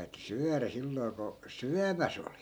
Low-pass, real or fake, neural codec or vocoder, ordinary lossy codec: none; real; none; none